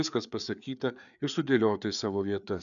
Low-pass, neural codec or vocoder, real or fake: 7.2 kHz; codec, 16 kHz, 4 kbps, FreqCodec, larger model; fake